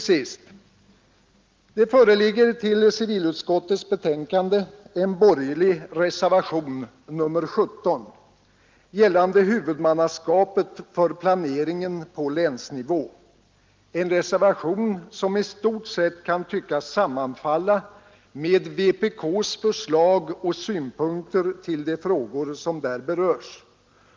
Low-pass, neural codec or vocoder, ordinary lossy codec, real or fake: 7.2 kHz; none; Opus, 32 kbps; real